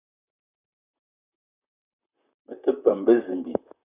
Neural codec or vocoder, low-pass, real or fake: vocoder, 44.1 kHz, 128 mel bands, Pupu-Vocoder; 3.6 kHz; fake